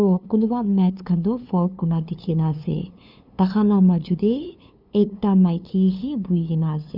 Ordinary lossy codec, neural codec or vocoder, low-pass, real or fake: none; codec, 16 kHz, 2 kbps, FunCodec, trained on LibriTTS, 25 frames a second; 5.4 kHz; fake